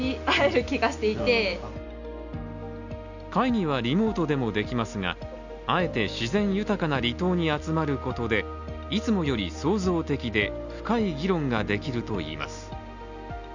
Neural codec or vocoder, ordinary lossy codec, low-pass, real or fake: none; none; 7.2 kHz; real